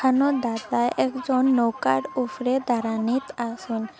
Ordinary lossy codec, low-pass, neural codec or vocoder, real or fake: none; none; none; real